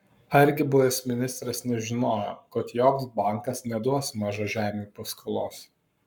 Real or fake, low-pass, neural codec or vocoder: fake; 19.8 kHz; codec, 44.1 kHz, 7.8 kbps, Pupu-Codec